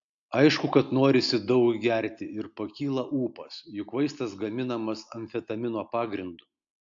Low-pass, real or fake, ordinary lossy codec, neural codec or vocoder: 7.2 kHz; real; AAC, 64 kbps; none